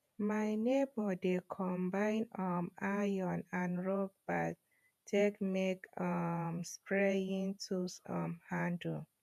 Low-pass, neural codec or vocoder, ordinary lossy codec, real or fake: 14.4 kHz; vocoder, 48 kHz, 128 mel bands, Vocos; none; fake